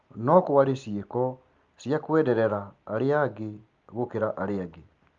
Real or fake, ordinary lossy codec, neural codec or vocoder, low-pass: real; Opus, 32 kbps; none; 7.2 kHz